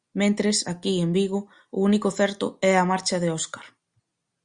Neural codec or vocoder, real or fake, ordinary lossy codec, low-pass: none; real; Opus, 64 kbps; 9.9 kHz